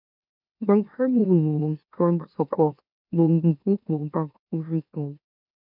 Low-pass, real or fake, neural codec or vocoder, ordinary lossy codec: 5.4 kHz; fake; autoencoder, 44.1 kHz, a latent of 192 numbers a frame, MeloTTS; none